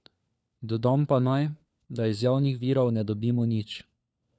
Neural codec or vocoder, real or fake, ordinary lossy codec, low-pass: codec, 16 kHz, 4 kbps, FunCodec, trained on LibriTTS, 50 frames a second; fake; none; none